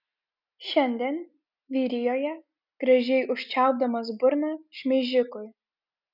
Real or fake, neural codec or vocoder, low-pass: real; none; 5.4 kHz